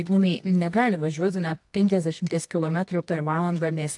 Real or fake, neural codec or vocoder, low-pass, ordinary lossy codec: fake; codec, 24 kHz, 0.9 kbps, WavTokenizer, medium music audio release; 10.8 kHz; AAC, 64 kbps